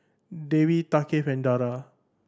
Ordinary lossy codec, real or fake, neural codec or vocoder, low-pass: none; real; none; none